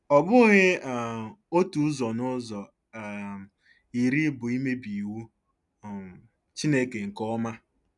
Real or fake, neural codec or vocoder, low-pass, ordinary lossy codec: real; none; 10.8 kHz; none